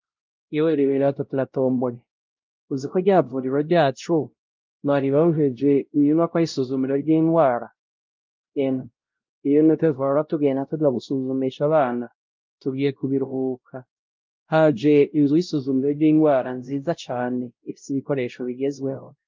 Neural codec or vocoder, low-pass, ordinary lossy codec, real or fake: codec, 16 kHz, 0.5 kbps, X-Codec, WavLM features, trained on Multilingual LibriSpeech; 7.2 kHz; Opus, 24 kbps; fake